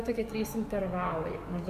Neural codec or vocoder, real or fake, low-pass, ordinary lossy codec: codec, 44.1 kHz, 7.8 kbps, DAC; fake; 14.4 kHz; Opus, 64 kbps